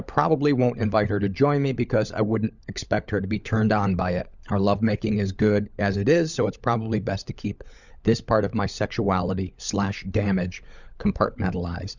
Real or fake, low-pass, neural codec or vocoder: fake; 7.2 kHz; codec, 16 kHz, 16 kbps, FunCodec, trained on LibriTTS, 50 frames a second